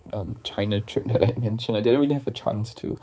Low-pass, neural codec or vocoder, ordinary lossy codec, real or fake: none; codec, 16 kHz, 4 kbps, X-Codec, HuBERT features, trained on balanced general audio; none; fake